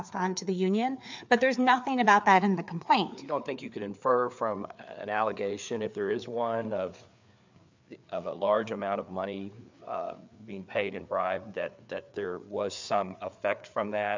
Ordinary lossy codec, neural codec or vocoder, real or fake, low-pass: MP3, 64 kbps; codec, 16 kHz, 4 kbps, FreqCodec, larger model; fake; 7.2 kHz